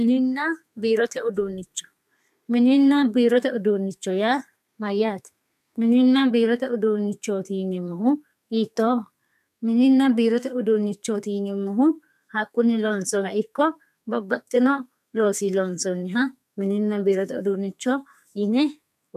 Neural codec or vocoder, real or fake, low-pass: codec, 32 kHz, 1.9 kbps, SNAC; fake; 14.4 kHz